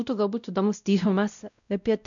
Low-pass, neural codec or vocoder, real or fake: 7.2 kHz; codec, 16 kHz, 0.5 kbps, X-Codec, WavLM features, trained on Multilingual LibriSpeech; fake